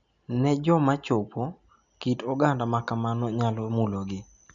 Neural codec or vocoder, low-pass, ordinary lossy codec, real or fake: none; 7.2 kHz; none; real